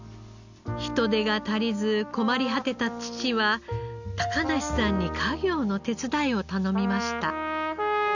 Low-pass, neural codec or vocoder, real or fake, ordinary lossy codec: 7.2 kHz; none; real; none